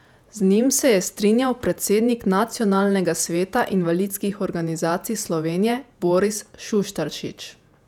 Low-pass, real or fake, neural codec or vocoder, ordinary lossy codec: 19.8 kHz; fake; vocoder, 48 kHz, 128 mel bands, Vocos; none